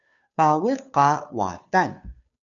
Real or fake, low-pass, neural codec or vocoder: fake; 7.2 kHz; codec, 16 kHz, 2 kbps, FunCodec, trained on Chinese and English, 25 frames a second